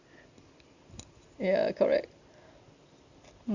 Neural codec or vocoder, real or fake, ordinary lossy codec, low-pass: none; real; Opus, 64 kbps; 7.2 kHz